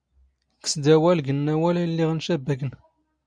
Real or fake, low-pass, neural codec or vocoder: real; 9.9 kHz; none